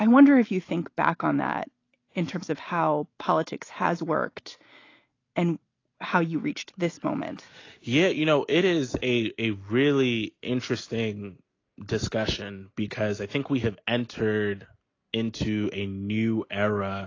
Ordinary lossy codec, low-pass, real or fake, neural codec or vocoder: AAC, 32 kbps; 7.2 kHz; real; none